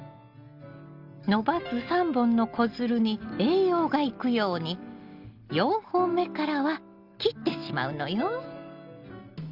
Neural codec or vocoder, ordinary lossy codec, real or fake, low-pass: none; Opus, 24 kbps; real; 5.4 kHz